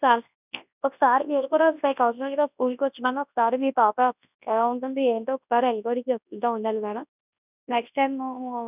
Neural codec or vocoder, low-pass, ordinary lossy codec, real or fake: codec, 24 kHz, 0.9 kbps, WavTokenizer, large speech release; 3.6 kHz; none; fake